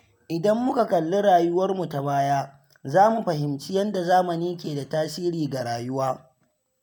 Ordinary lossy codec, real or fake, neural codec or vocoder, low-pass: none; real; none; none